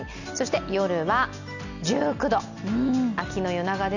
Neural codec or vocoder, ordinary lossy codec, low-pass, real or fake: none; none; 7.2 kHz; real